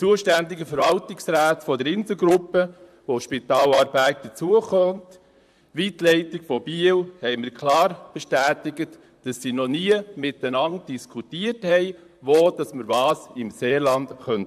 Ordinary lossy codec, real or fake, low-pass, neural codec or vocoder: AAC, 96 kbps; fake; 14.4 kHz; vocoder, 44.1 kHz, 128 mel bands, Pupu-Vocoder